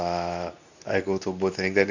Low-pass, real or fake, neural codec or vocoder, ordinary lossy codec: 7.2 kHz; fake; codec, 24 kHz, 0.9 kbps, WavTokenizer, medium speech release version 2; none